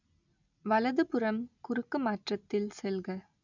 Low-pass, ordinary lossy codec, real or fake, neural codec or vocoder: 7.2 kHz; none; real; none